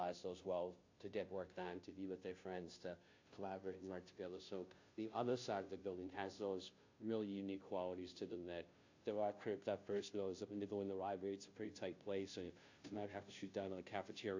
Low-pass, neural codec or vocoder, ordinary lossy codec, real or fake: 7.2 kHz; codec, 16 kHz, 0.5 kbps, FunCodec, trained on Chinese and English, 25 frames a second; AAC, 48 kbps; fake